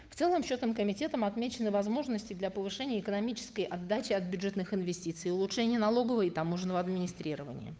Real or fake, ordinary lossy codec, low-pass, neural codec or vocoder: fake; none; none; codec, 16 kHz, 8 kbps, FunCodec, trained on Chinese and English, 25 frames a second